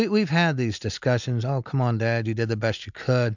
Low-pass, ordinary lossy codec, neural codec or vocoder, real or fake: 7.2 kHz; MP3, 64 kbps; none; real